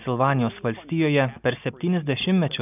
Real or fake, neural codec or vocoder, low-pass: real; none; 3.6 kHz